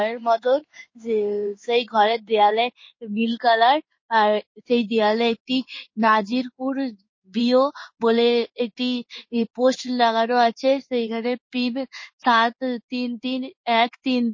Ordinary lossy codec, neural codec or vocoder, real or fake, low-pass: MP3, 32 kbps; codec, 16 kHz in and 24 kHz out, 1 kbps, XY-Tokenizer; fake; 7.2 kHz